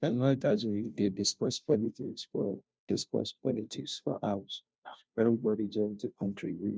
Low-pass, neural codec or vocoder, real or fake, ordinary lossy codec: none; codec, 16 kHz, 0.5 kbps, FunCodec, trained on Chinese and English, 25 frames a second; fake; none